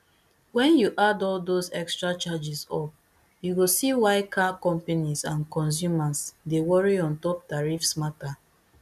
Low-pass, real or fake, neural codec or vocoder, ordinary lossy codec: 14.4 kHz; real; none; none